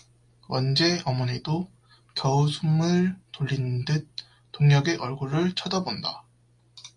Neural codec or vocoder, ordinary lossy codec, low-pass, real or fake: none; MP3, 64 kbps; 10.8 kHz; real